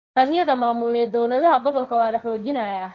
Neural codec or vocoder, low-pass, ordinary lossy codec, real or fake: codec, 16 kHz, 1.1 kbps, Voila-Tokenizer; 7.2 kHz; none; fake